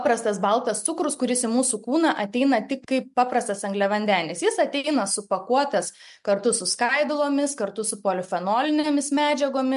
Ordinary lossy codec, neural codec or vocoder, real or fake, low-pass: MP3, 64 kbps; none; real; 10.8 kHz